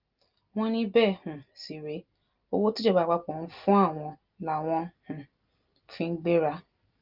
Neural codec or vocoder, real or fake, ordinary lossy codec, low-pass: none; real; Opus, 24 kbps; 5.4 kHz